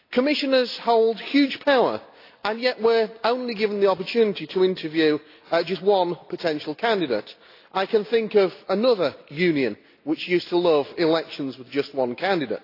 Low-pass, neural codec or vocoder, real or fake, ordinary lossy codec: 5.4 kHz; none; real; AAC, 32 kbps